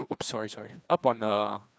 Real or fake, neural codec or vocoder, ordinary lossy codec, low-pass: fake; codec, 16 kHz, 2 kbps, FreqCodec, larger model; none; none